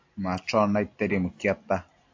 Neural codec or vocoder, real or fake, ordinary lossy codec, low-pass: none; real; AAC, 48 kbps; 7.2 kHz